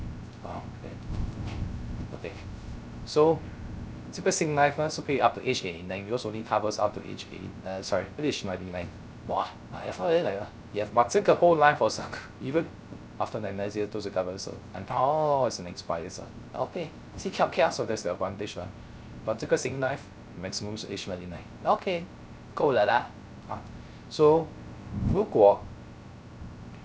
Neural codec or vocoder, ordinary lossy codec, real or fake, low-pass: codec, 16 kHz, 0.3 kbps, FocalCodec; none; fake; none